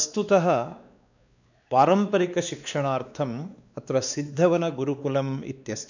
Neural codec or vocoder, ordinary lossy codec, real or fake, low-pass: codec, 16 kHz, 2 kbps, X-Codec, WavLM features, trained on Multilingual LibriSpeech; none; fake; 7.2 kHz